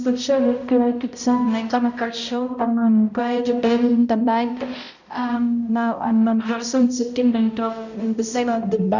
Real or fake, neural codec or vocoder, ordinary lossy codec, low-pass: fake; codec, 16 kHz, 0.5 kbps, X-Codec, HuBERT features, trained on balanced general audio; none; 7.2 kHz